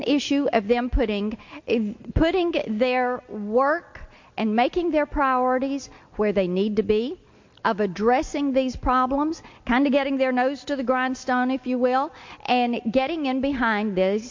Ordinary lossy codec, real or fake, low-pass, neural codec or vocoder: MP3, 48 kbps; real; 7.2 kHz; none